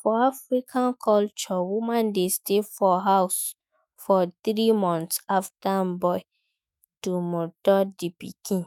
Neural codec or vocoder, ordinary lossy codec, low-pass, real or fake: autoencoder, 48 kHz, 128 numbers a frame, DAC-VAE, trained on Japanese speech; none; 19.8 kHz; fake